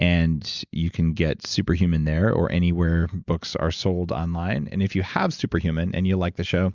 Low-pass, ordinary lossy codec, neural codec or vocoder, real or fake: 7.2 kHz; Opus, 64 kbps; none; real